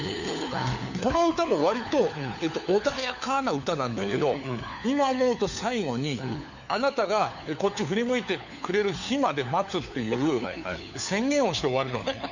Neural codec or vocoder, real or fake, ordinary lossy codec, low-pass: codec, 16 kHz, 4 kbps, FunCodec, trained on LibriTTS, 50 frames a second; fake; none; 7.2 kHz